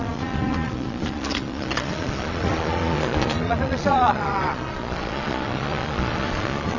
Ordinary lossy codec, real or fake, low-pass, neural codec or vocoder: none; fake; 7.2 kHz; vocoder, 22.05 kHz, 80 mel bands, Vocos